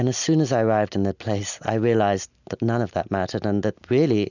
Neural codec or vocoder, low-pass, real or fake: none; 7.2 kHz; real